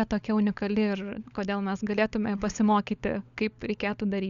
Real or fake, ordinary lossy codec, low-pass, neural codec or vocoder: fake; Opus, 64 kbps; 7.2 kHz; codec, 16 kHz, 16 kbps, FunCodec, trained on LibriTTS, 50 frames a second